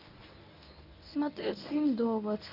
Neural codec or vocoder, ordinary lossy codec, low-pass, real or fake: codec, 24 kHz, 0.9 kbps, WavTokenizer, medium speech release version 1; none; 5.4 kHz; fake